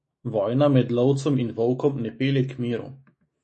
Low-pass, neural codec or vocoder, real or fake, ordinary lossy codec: 10.8 kHz; autoencoder, 48 kHz, 128 numbers a frame, DAC-VAE, trained on Japanese speech; fake; MP3, 32 kbps